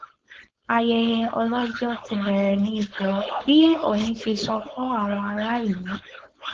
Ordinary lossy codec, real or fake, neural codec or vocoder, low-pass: Opus, 16 kbps; fake; codec, 16 kHz, 4.8 kbps, FACodec; 7.2 kHz